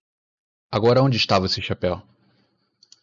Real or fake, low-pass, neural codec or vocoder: real; 7.2 kHz; none